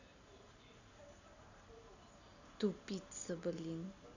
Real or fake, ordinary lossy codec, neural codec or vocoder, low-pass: real; none; none; 7.2 kHz